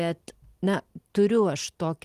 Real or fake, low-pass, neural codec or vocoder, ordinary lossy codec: real; 14.4 kHz; none; Opus, 24 kbps